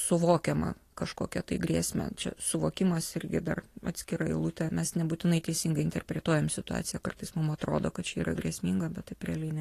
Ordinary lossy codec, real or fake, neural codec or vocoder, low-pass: AAC, 48 kbps; real; none; 14.4 kHz